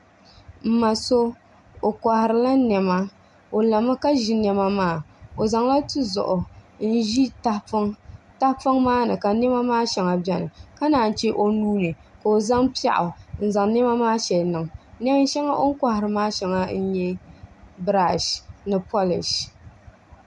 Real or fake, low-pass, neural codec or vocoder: real; 10.8 kHz; none